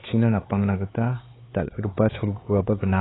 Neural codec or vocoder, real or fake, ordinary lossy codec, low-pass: codec, 16 kHz, 4 kbps, X-Codec, HuBERT features, trained on LibriSpeech; fake; AAC, 16 kbps; 7.2 kHz